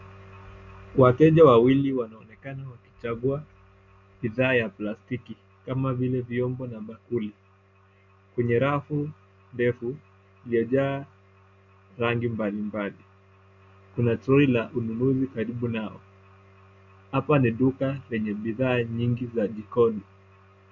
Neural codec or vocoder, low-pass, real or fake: none; 7.2 kHz; real